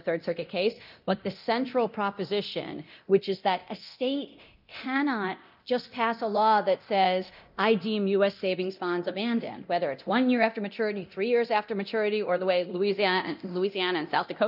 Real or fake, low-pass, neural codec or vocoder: fake; 5.4 kHz; codec, 24 kHz, 0.9 kbps, DualCodec